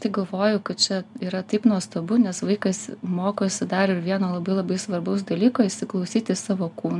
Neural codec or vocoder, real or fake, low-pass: none; real; 10.8 kHz